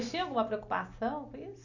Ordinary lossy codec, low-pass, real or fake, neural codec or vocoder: none; 7.2 kHz; real; none